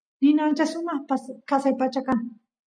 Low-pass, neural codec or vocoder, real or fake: 9.9 kHz; none; real